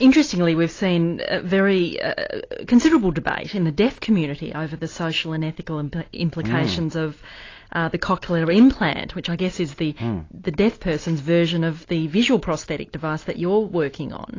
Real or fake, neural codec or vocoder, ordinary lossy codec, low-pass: real; none; AAC, 32 kbps; 7.2 kHz